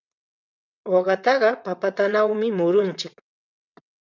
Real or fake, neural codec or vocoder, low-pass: fake; vocoder, 22.05 kHz, 80 mel bands, WaveNeXt; 7.2 kHz